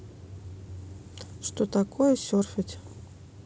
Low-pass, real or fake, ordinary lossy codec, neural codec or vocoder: none; real; none; none